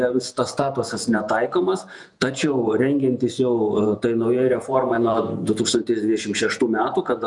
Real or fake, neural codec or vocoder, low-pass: fake; vocoder, 48 kHz, 128 mel bands, Vocos; 10.8 kHz